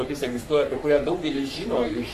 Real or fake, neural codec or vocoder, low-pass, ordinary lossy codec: fake; codec, 44.1 kHz, 3.4 kbps, Pupu-Codec; 14.4 kHz; AAC, 96 kbps